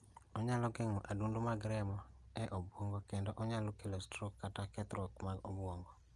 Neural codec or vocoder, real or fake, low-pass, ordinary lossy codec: none; real; 10.8 kHz; Opus, 32 kbps